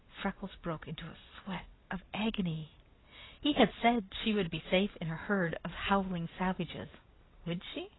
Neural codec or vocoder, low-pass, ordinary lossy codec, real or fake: none; 7.2 kHz; AAC, 16 kbps; real